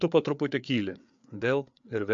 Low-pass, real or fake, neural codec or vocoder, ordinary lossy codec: 7.2 kHz; fake; codec, 16 kHz, 4 kbps, FreqCodec, larger model; MP3, 64 kbps